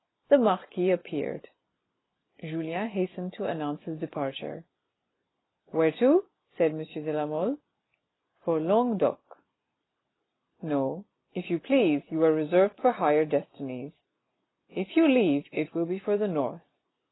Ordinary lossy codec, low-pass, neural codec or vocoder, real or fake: AAC, 16 kbps; 7.2 kHz; none; real